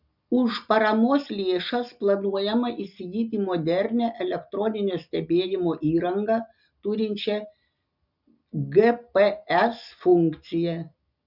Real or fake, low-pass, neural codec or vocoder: real; 5.4 kHz; none